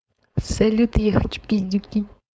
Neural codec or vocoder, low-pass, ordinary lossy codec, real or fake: codec, 16 kHz, 4.8 kbps, FACodec; none; none; fake